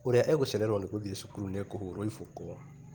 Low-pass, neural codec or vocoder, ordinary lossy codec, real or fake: 19.8 kHz; vocoder, 44.1 kHz, 128 mel bands every 256 samples, BigVGAN v2; Opus, 24 kbps; fake